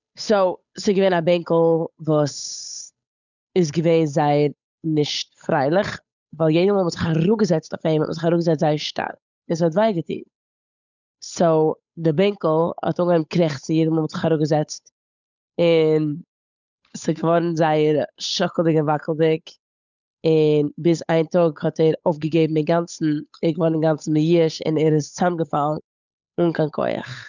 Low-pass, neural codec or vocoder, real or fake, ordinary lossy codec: 7.2 kHz; codec, 16 kHz, 8 kbps, FunCodec, trained on Chinese and English, 25 frames a second; fake; none